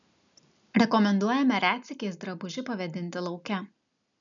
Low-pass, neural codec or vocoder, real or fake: 7.2 kHz; none; real